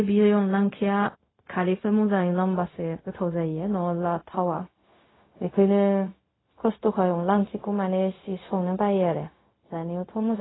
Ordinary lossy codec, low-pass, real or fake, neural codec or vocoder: AAC, 16 kbps; 7.2 kHz; fake; codec, 16 kHz, 0.4 kbps, LongCat-Audio-Codec